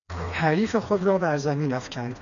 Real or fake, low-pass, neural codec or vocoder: fake; 7.2 kHz; codec, 16 kHz, 2 kbps, FreqCodec, smaller model